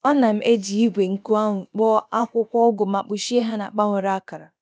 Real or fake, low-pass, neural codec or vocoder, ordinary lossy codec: fake; none; codec, 16 kHz, about 1 kbps, DyCAST, with the encoder's durations; none